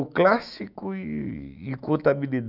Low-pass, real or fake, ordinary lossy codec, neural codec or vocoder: 5.4 kHz; fake; none; autoencoder, 48 kHz, 128 numbers a frame, DAC-VAE, trained on Japanese speech